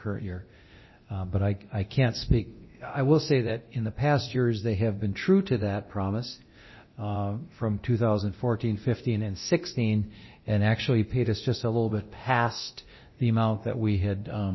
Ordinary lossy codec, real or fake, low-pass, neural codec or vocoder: MP3, 24 kbps; fake; 7.2 kHz; codec, 24 kHz, 0.9 kbps, DualCodec